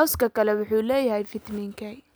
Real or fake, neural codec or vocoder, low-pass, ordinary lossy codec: real; none; none; none